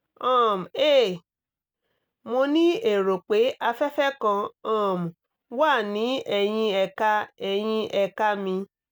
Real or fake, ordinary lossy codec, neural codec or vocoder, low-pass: real; none; none; none